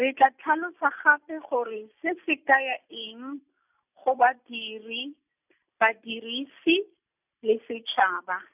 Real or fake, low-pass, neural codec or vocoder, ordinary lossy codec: real; 3.6 kHz; none; none